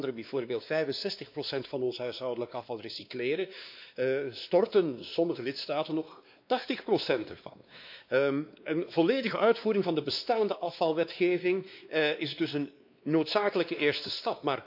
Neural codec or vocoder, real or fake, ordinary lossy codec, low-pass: codec, 16 kHz, 2 kbps, X-Codec, WavLM features, trained on Multilingual LibriSpeech; fake; MP3, 48 kbps; 5.4 kHz